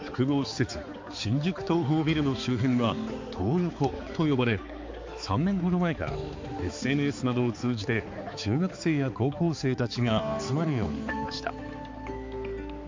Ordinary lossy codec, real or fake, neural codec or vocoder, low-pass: MP3, 48 kbps; fake; codec, 16 kHz, 4 kbps, X-Codec, HuBERT features, trained on balanced general audio; 7.2 kHz